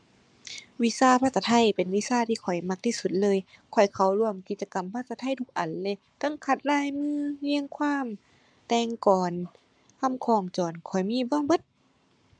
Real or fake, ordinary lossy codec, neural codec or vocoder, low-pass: fake; MP3, 96 kbps; codec, 44.1 kHz, 7.8 kbps, Pupu-Codec; 9.9 kHz